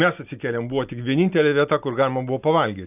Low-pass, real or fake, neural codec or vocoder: 3.6 kHz; real; none